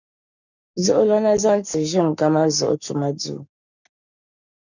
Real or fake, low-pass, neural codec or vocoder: fake; 7.2 kHz; codec, 44.1 kHz, 7.8 kbps, Pupu-Codec